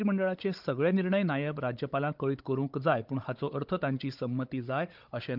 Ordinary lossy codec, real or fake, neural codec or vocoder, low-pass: Opus, 32 kbps; fake; codec, 16 kHz, 16 kbps, FunCodec, trained on Chinese and English, 50 frames a second; 5.4 kHz